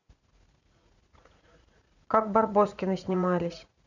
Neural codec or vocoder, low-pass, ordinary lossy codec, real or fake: vocoder, 44.1 kHz, 80 mel bands, Vocos; 7.2 kHz; none; fake